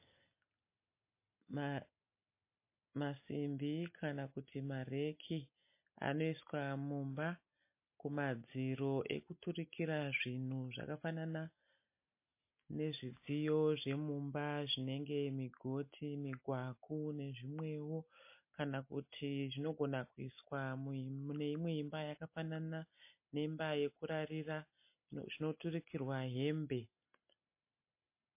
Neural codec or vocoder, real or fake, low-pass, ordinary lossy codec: none; real; 3.6 kHz; MP3, 24 kbps